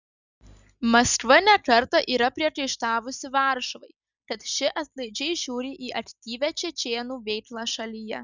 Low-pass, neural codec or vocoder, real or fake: 7.2 kHz; none; real